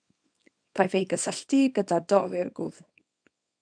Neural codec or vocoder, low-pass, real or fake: codec, 24 kHz, 0.9 kbps, WavTokenizer, small release; 9.9 kHz; fake